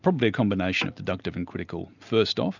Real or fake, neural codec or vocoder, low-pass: real; none; 7.2 kHz